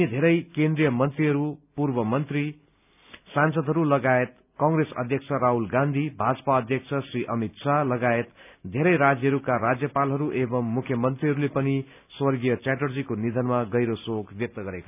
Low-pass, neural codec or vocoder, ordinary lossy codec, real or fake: 3.6 kHz; none; none; real